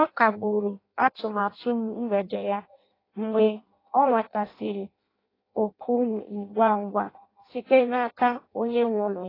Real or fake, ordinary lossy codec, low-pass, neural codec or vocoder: fake; AAC, 24 kbps; 5.4 kHz; codec, 16 kHz in and 24 kHz out, 0.6 kbps, FireRedTTS-2 codec